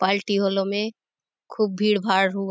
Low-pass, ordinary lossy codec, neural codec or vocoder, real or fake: none; none; none; real